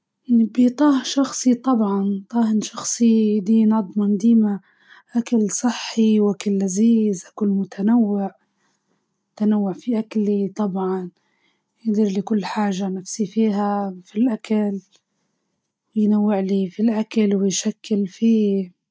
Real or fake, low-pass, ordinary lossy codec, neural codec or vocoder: real; none; none; none